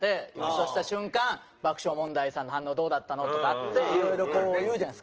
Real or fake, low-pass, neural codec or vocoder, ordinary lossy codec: real; 7.2 kHz; none; Opus, 16 kbps